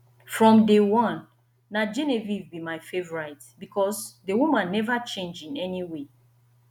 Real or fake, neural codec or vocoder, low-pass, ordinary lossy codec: real; none; 19.8 kHz; none